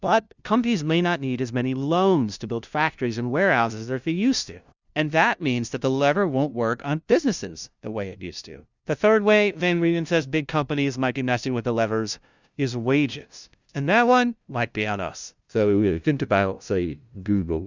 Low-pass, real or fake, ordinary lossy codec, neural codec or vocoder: 7.2 kHz; fake; Opus, 64 kbps; codec, 16 kHz, 0.5 kbps, FunCodec, trained on LibriTTS, 25 frames a second